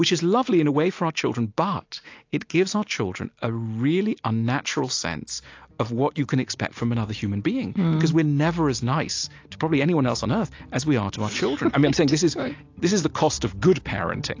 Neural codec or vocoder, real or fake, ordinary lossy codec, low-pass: none; real; AAC, 48 kbps; 7.2 kHz